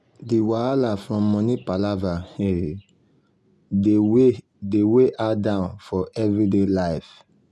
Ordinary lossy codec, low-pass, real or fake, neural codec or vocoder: none; none; real; none